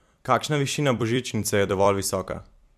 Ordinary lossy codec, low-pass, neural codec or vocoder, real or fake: MP3, 96 kbps; 14.4 kHz; vocoder, 44.1 kHz, 128 mel bands every 256 samples, BigVGAN v2; fake